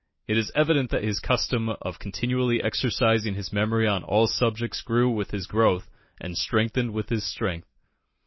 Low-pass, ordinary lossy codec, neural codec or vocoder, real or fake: 7.2 kHz; MP3, 24 kbps; none; real